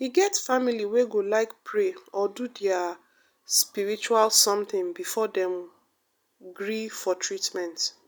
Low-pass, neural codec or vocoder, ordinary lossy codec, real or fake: none; none; none; real